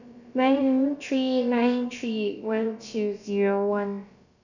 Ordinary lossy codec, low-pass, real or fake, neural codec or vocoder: none; 7.2 kHz; fake; codec, 16 kHz, about 1 kbps, DyCAST, with the encoder's durations